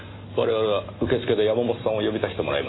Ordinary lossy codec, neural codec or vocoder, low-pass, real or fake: AAC, 16 kbps; none; 7.2 kHz; real